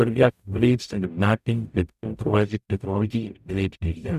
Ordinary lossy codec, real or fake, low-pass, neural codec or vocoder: none; fake; 14.4 kHz; codec, 44.1 kHz, 0.9 kbps, DAC